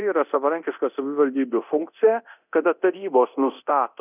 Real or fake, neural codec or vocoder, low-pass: fake; codec, 24 kHz, 0.9 kbps, DualCodec; 3.6 kHz